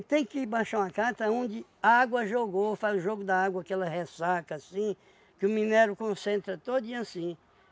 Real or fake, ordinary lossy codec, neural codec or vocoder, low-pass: real; none; none; none